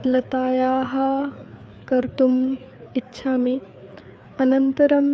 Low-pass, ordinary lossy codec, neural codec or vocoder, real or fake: none; none; codec, 16 kHz, 4 kbps, FreqCodec, larger model; fake